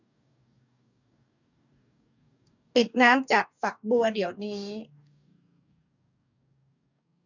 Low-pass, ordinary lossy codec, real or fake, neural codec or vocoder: 7.2 kHz; none; fake; codec, 44.1 kHz, 2.6 kbps, DAC